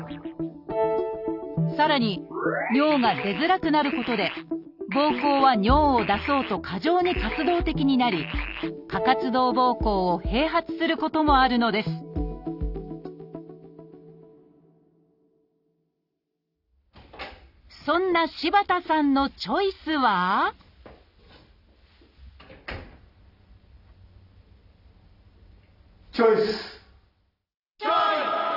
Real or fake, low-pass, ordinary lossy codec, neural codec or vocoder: real; 5.4 kHz; none; none